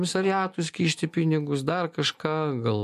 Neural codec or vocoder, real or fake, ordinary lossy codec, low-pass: none; real; MP3, 64 kbps; 14.4 kHz